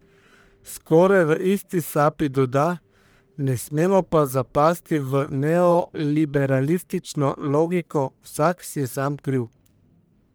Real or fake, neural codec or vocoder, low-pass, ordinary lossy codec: fake; codec, 44.1 kHz, 1.7 kbps, Pupu-Codec; none; none